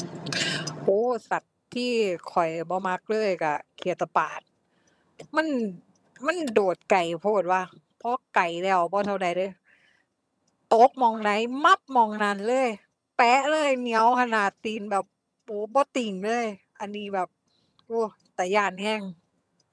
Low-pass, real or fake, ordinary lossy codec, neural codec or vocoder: none; fake; none; vocoder, 22.05 kHz, 80 mel bands, HiFi-GAN